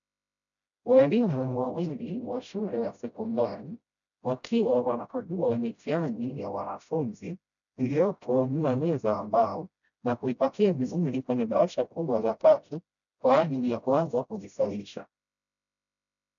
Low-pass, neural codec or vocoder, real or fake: 7.2 kHz; codec, 16 kHz, 0.5 kbps, FreqCodec, smaller model; fake